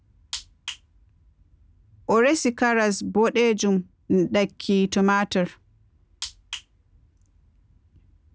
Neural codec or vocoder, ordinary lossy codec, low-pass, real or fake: none; none; none; real